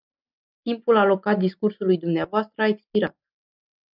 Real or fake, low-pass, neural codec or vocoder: real; 5.4 kHz; none